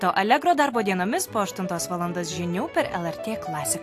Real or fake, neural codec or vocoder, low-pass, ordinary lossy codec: real; none; 14.4 kHz; AAC, 64 kbps